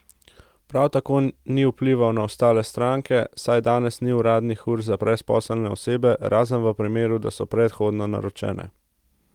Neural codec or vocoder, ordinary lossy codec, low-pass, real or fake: none; Opus, 24 kbps; 19.8 kHz; real